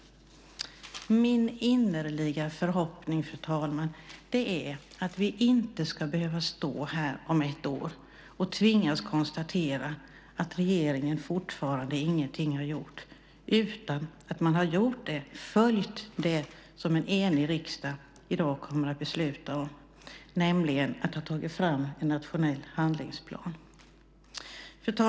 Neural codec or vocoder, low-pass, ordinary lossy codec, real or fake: none; none; none; real